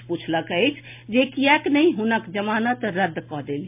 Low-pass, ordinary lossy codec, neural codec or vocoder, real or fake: 3.6 kHz; MP3, 32 kbps; none; real